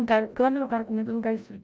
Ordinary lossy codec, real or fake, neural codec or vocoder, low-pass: none; fake; codec, 16 kHz, 0.5 kbps, FreqCodec, larger model; none